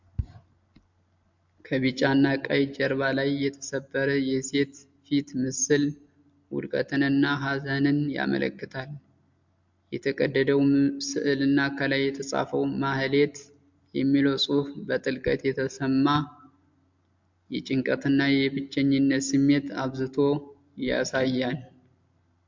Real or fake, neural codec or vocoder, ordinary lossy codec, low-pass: real; none; MP3, 64 kbps; 7.2 kHz